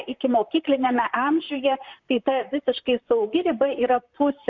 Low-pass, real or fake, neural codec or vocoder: 7.2 kHz; fake; vocoder, 44.1 kHz, 128 mel bands, Pupu-Vocoder